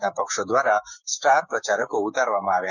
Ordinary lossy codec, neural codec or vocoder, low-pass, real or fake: none; codec, 16 kHz, 6 kbps, DAC; 7.2 kHz; fake